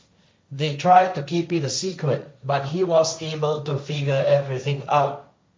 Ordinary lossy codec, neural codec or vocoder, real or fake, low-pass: none; codec, 16 kHz, 1.1 kbps, Voila-Tokenizer; fake; none